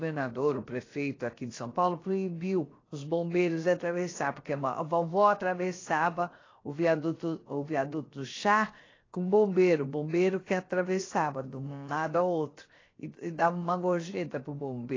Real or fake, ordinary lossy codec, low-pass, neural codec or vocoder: fake; AAC, 32 kbps; 7.2 kHz; codec, 16 kHz, 0.7 kbps, FocalCodec